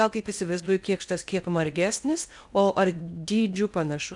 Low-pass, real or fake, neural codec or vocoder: 10.8 kHz; fake; codec, 16 kHz in and 24 kHz out, 0.8 kbps, FocalCodec, streaming, 65536 codes